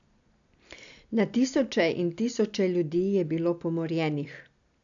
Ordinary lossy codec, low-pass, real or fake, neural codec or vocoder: none; 7.2 kHz; real; none